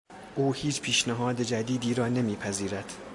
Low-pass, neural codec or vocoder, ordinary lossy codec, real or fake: 10.8 kHz; none; AAC, 64 kbps; real